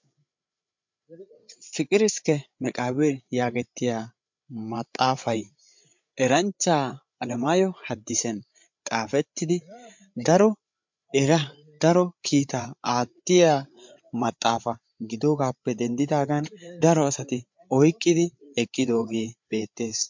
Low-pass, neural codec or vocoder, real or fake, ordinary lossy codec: 7.2 kHz; codec, 16 kHz, 8 kbps, FreqCodec, larger model; fake; MP3, 64 kbps